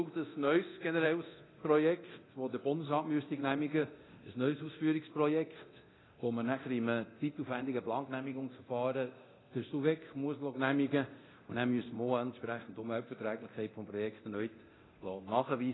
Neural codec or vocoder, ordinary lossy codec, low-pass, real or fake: codec, 24 kHz, 0.9 kbps, DualCodec; AAC, 16 kbps; 7.2 kHz; fake